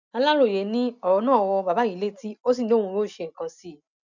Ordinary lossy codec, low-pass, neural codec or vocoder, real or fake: none; 7.2 kHz; none; real